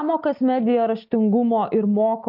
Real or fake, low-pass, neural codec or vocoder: real; 5.4 kHz; none